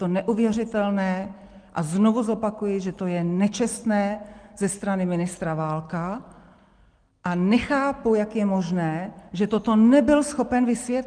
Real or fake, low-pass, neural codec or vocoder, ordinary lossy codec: real; 9.9 kHz; none; Opus, 24 kbps